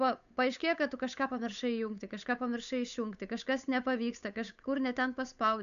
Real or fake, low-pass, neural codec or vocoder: fake; 7.2 kHz; codec, 16 kHz, 8 kbps, FunCodec, trained on Chinese and English, 25 frames a second